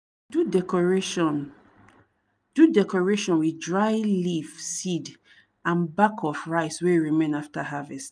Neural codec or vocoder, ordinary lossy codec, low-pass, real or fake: none; none; none; real